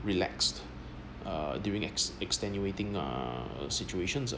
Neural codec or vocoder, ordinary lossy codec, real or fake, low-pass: none; none; real; none